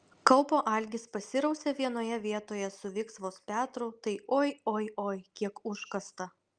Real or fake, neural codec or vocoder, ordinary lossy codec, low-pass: real; none; Opus, 32 kbps; 9.9 kHz